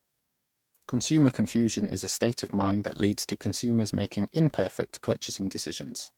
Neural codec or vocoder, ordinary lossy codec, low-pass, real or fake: codec, 44.1 kHz, 2.6 kbps, DAC; MP3, 96 kbps; 19.8 kHz; fake